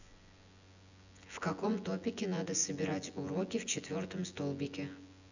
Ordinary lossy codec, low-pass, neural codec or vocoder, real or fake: none; 7.2 kHz; vocoder, 24 kHz, 100 mel bands, Vocos; fake